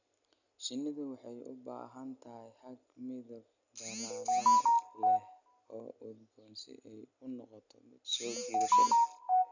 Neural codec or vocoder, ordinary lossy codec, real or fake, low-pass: none; none; real; 7.2 kHz